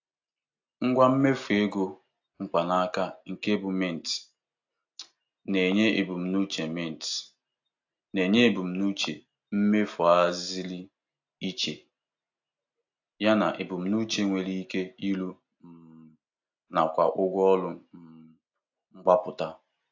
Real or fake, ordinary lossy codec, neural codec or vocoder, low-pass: real; AAC, 48 kbps; none; 7.2 kHz